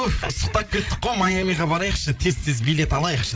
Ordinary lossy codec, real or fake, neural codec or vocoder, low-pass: none; fake; codec, 16 kHz, 8 kbps, FreqCodec, larger model; none